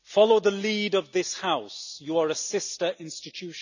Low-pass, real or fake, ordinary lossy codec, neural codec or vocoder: 7.2 kHz; real; none; none